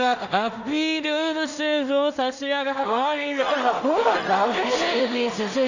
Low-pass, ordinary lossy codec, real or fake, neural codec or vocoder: 7.2 kHz; none; fake; codec, 16 kHz in and 24 kHz out, 0.4 kbps, LongCat-Audio-Codec, two codebook decoder